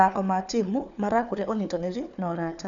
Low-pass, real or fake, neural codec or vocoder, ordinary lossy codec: 7.2 kHz; fake; codec, 16 kHz, 4 kbps, FunCodec, trained on Chinese and English, 50 frames a second; none